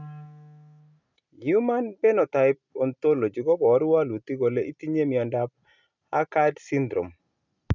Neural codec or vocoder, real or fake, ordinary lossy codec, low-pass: none; real; none; 7.2 kHz